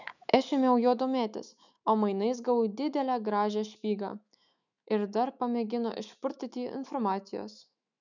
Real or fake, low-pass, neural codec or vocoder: fake; 7.2 kHz; autoencoder, 48 kHz, 128 numbers a frame, DAC-VAE, trained on Japanese speech